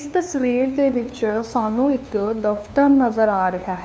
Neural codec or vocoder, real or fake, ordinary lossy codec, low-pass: codec, 16 kHz, 2 kbps, FunCodec, trained on LibriTTS, 25 frames a second; fake; none; none